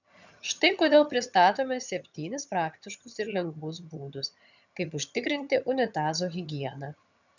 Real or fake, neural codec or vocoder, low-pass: fake; vocoder, 22.05 kHz, 80 mel bands, HiFi-GAN; 7.2 kHz